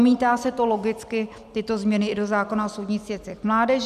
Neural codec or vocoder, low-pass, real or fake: none; 14.4 kHz; real